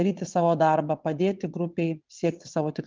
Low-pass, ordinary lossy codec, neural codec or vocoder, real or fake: 7.2 kHz; Opus, 32 kbps; vocoder, 22.05 kHz, 80 mel bands, WaveNeXt; fake